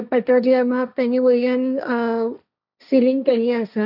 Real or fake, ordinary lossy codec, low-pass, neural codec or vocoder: fake; none; 5.4 kHz; codec, 16 kHz, 1.1 kbps, Voila-Tokenizer